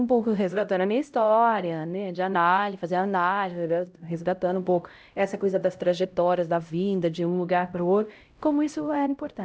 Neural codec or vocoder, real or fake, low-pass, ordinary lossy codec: codec, 16 kHz, 0.5 kbps, X-Codec, HuBERT features, trained on LibriSpeech; fake; none; none